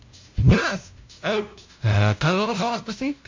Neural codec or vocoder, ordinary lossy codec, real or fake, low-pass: codec, 16 kHz, 0.5 kbps, FunCodec, trained on LibriTTS, 25 frames a second; MP3, 48 kbps; fake; 7.2 kHz